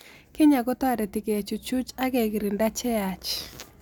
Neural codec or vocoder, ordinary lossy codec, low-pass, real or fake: none; none; none; real